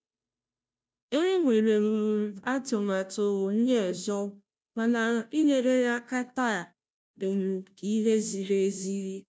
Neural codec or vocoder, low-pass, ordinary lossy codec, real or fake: codec, 16 kHz, 0.5 kbps, FunCodec, trained on Chinese and English, 25 frames a second; none; none; fake